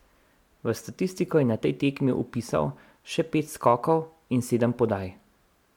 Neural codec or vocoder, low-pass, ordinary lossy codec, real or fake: none; 19.8 kHz; MP3, 96 kbps; real